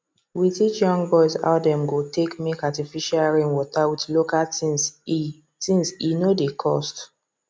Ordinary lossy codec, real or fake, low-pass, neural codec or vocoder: none; real; none; none